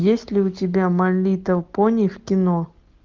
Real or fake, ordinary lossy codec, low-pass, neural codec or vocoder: real; Opus, 32 kbps; 7.2 kHz; none